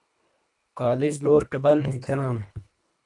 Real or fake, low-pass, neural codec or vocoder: fake; 10.8 kHz; codec, 24 kHz, 1.5 kbps, HILCodec